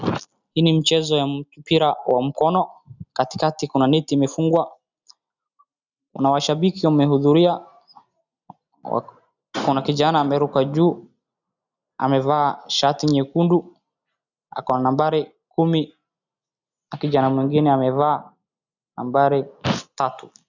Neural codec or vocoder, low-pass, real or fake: none; 7.2 kHz; real